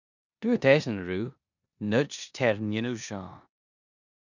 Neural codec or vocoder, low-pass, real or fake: codec, 16 kHz in and 24 kHz out, 0.9 kbps, LongCat-Audio-Codec, four codebook decoder; 7.2 kHz; fake